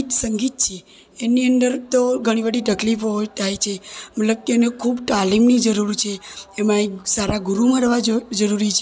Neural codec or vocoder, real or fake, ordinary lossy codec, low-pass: none; real; none; none